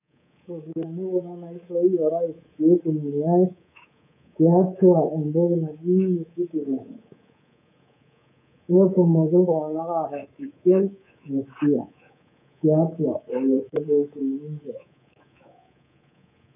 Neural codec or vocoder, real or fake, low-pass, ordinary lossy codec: codec, 24 kHz, 3.1 kbps, DualCodec; fake; 3.6 kHz; none